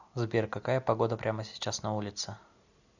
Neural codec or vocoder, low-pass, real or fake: none; 7.2 kHz; real